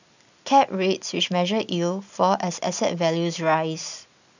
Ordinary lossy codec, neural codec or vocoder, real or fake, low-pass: none; none; real; 7.2 kHz